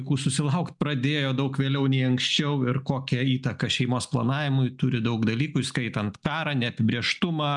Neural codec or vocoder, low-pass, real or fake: none; 10.8 kHz; real